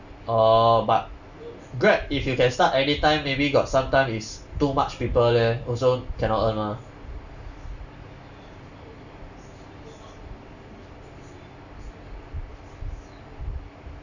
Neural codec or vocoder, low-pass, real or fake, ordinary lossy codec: none; 7.2 kHz; real; none